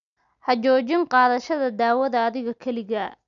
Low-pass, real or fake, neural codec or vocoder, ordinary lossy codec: 7.2 kHz; real; none; none